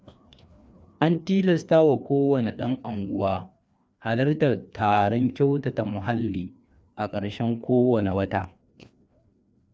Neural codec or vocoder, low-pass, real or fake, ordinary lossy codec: codec, 16 kHz, 2 kbps, FreqCodec, larger model; none; fake; none